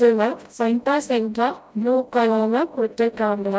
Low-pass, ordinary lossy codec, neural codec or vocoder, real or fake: none; none; codec, 16 kHz, 0.5 kbps, FreqCodec, smaller model; fake